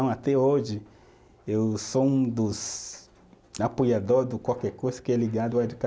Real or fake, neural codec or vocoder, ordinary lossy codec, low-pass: real; none; none; none